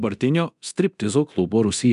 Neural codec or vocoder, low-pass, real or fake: codec, 24 kHz, 0.9 kbps, DualCodec; 10.8 kHz; fake